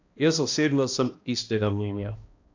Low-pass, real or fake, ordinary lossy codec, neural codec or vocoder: 7.2 kHz; fake; AAC, 48 kbps; codec, 16 kHz, 1 kbps, X-Codec, HuBERT features, trained on balanced general audio